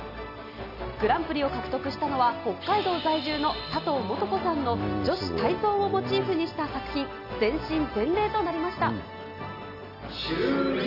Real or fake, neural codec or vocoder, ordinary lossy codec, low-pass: real; none; none; 5.4 kHz